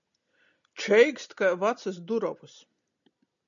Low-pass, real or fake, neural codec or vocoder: 7.2 kHz; real; none